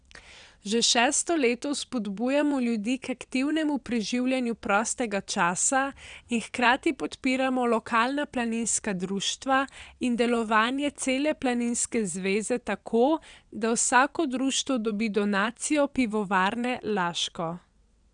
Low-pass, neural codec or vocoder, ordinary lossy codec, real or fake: 9.9 kHz; vocoder, 22.05 kHz, 80 mel bands, WaveNeXt; none; fake